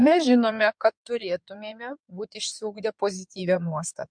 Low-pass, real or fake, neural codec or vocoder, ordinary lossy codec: 9.9 kHz; fake; codec, 16 kHz in and 24 kHz out, 2.2 kbps, FireRedTTS-2 codec; MP3, 64 kbps